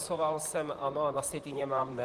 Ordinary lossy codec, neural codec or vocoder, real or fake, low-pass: Opus, 24 kbps; vocoder, 44.1 kHz, 128 mel bands, Pupu-Vocoder; fake; 14.4 kHz